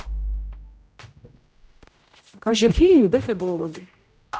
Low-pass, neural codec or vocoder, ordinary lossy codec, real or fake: none; codec, 16 kHz, 0.5 kbps, X-Codec, HuBERT features, trained on general audio; none; fake